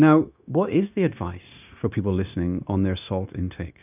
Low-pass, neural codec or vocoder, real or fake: 3.6 kHz; codec, 16 kHz, 0.9 kbps, LongCat-Audio-Codec; fake